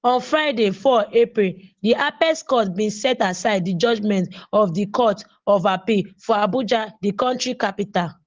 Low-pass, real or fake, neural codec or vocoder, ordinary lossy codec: 7.2 kHz; real; none; Opus, 16 kbps